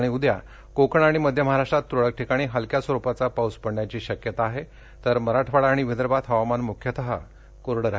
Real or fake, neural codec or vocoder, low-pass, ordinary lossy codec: real; none; none; none